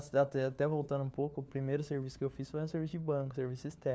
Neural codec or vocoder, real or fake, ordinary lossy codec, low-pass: codec, 16 kHz, 4 kbps, FunCodec, trained on LibriTTS, 50 frames a second; fake; none; none